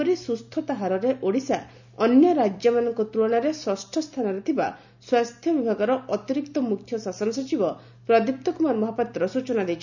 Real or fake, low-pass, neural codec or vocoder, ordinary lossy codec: real; 7.2 kHz; none; none